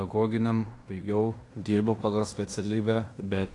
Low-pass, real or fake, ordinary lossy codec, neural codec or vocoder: 10.8 kHz; fake; AAC, 48 kbps; codec, 16 kHz in and 24 kHz out, 0.9 kbps, LongCat-Audio-Codec, fine tuned four codebook decoder